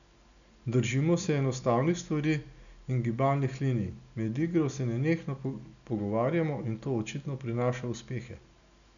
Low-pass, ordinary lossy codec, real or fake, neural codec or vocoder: 7.2 kHz; none; real; none